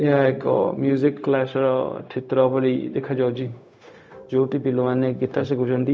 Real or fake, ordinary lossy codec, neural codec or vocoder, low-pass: fake; none; codec, 16 kHz, 0.4 kbps, LongCat-Audio-Codec; none